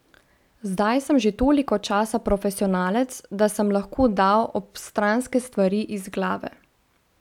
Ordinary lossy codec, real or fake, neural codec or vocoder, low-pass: none; real; none; 19.8 kHz